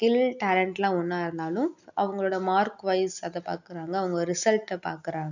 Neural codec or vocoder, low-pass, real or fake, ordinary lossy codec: none; 7.2 kHz; real; none